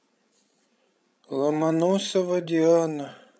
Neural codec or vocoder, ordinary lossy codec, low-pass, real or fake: codec, 16 kHz, 16 kbps, FreqCodec, larger model; none; none; fake